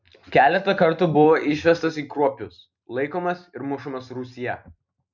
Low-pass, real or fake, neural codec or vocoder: 7.2 kHz; real; none